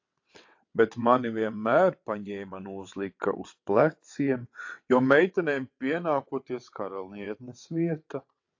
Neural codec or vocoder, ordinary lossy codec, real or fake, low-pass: vocoder, 22.05 kHz, 80 mel bands, WaveNeXt; AAC, 48 kbps; fake; 7.2 kHz